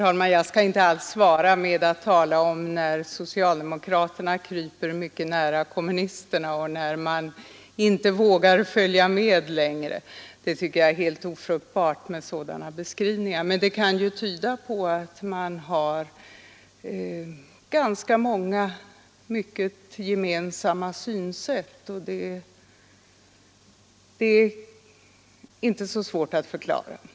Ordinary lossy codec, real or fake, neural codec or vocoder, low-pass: none; real; none; none